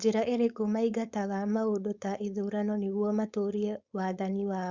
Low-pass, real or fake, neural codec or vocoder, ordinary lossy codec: 7.2 kHz; fake; codec, 16 kHz, 4.8 kbps, FACodec; Opus, 64 kbps